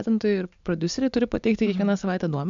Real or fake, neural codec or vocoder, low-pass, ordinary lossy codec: real; none; 7.2 kHz; MP3, 64 kbps